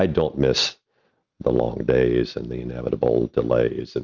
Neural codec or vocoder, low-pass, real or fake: none; 7.2 kHz; real